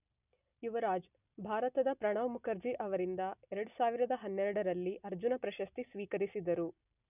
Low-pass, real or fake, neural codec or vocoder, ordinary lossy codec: 3.6 kHz; real; none; none